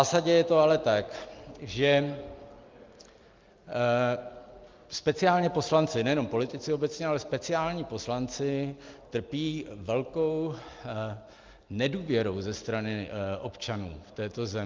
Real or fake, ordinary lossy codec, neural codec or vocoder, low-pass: real; Opus, 24 kbps; none; 7.2 kHz